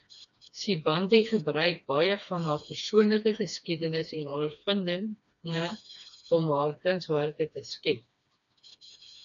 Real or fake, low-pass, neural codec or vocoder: fake; 7.2 kHz; codec, 16 kHz, 2 kbps, FreqCodec, smaller model